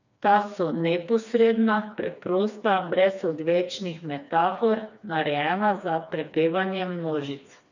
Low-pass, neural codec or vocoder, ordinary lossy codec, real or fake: 7.2 kHz; codec, 16 kHz, 2 kbps, FreqCodec, smaller model; none; fake